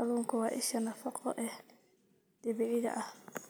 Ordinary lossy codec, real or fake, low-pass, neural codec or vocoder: none; real; none; none